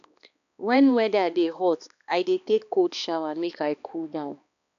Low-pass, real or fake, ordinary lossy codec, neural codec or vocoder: 7.2 kHz; fake; none; codec, 16 kHz, 2 kbps, X-Codec, HuBERT features, trained on balanced general audio